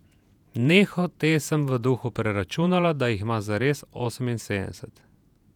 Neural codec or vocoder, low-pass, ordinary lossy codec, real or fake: vocoder, 48 kHz, 128 mel bands, Vocos; 19.8 kHz; none; fake